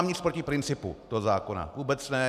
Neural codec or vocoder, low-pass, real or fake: none; 14.4 kHz; real